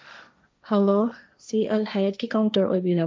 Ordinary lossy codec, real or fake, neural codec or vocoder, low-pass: none; fake; codec, 16 kHz, 1.1 kbps, Voila-Tokenizer; none